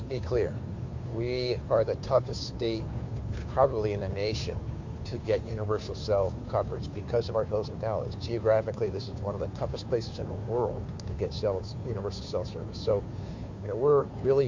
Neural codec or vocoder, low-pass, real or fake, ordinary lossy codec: codec, 16 kHz, 2 kbps, FunCodec, trained on Chinese and English, 25 frames a second; 7.2 kHz; fake; MP3, 48 kbps